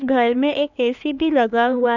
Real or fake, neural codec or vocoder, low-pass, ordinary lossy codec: fake; codec, 16 kHz, 2 kbps, X-Codec, HuBERT features, trained on LibriSpeech; 7.2 kHz; none